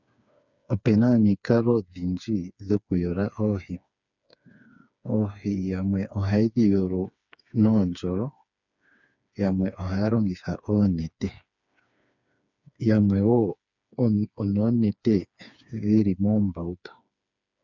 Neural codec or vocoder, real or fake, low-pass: codec, 16 kHz, 4 kbps, FreqCodec, smaller model; fake; 7.2 kHz